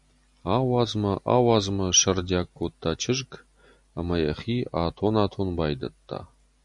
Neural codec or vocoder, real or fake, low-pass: none; real; 10.8 kHz